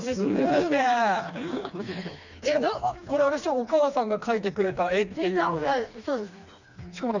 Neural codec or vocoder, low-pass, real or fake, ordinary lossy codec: codec, 16 kHz, 2 kbps, FreqCodec, smaller model; 7.2 kHz; fake; none